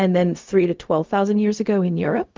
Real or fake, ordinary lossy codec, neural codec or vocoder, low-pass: fake; Opus, 32 kbps; codec, 16 kHz, 0.4 kbps, LongCat-Audio-Codec; 7.2 kHz